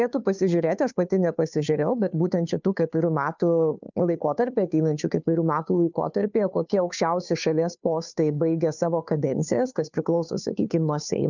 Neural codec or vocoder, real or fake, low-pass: codec, 16 kHz, 2 kbps, FunCodec, trained on Chinese and English, 25 frames a second; fake; 7.2 kHz